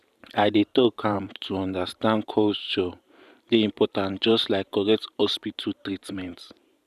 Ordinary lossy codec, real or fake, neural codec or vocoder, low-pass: none; fake; vocoder, 44.1 kHz, 128 mel bands every 512 samples, BigVGAN v2; 14.4 kHz